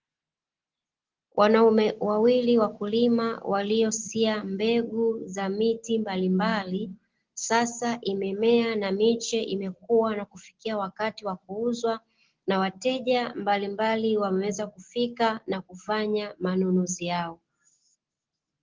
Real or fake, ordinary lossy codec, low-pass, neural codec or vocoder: real; Opus, 16 kbps; 7.2 kHz; none